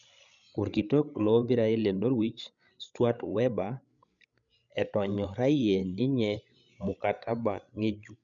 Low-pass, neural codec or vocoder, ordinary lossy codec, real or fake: 7.2 kHz; codec, 16 kHz, 8 kbps, FreqCodec, larger model; none; fake